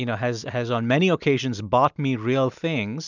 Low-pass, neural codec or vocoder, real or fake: 7.2 kHz; none; real